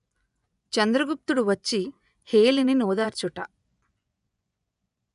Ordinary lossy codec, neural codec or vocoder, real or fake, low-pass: none; vocoder, 24 kHz, 100 mel bands, Vocos; fake; 10.8 kHz